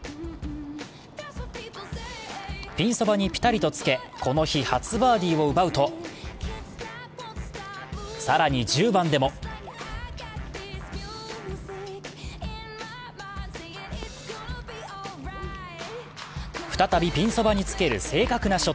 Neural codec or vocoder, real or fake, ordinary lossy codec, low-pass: none; real; none; none